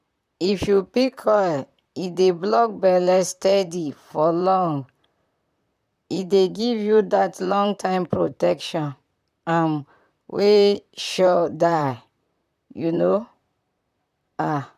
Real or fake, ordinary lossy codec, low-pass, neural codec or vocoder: fake; none; 14.4 kHz; vocoder, 44.1 kHz, 128 mel bands, Pupu-Vocoder